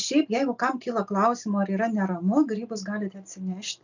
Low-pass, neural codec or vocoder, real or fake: 7.2 kHz; none; real